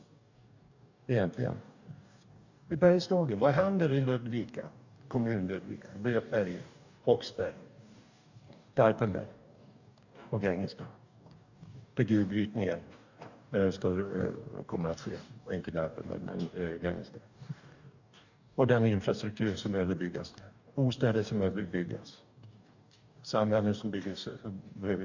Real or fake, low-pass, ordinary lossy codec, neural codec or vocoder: fake; 7.2 kHz; none; codec, 44.1 kHz, 2.6 kbps, DAC